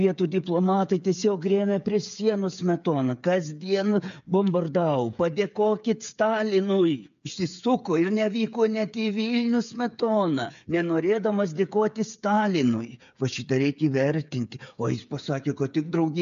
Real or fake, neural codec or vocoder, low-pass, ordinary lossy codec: fake; codec, 16 kHz, 8 kbps, FreqCodec, smaller model; 7.2 kHz; AAC, 96 kbps